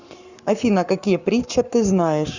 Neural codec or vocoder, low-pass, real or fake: codec, 44.1 kHz, 7.8 kbps, Pupu-Codec; 7.2 kHz; fake